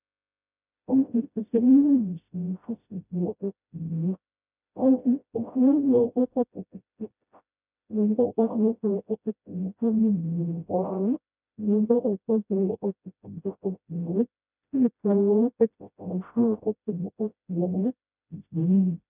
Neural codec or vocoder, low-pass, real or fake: codec, 16 kHz, 0.5 kbps, FreqCodec, smaller model; 3.6 kHz; fake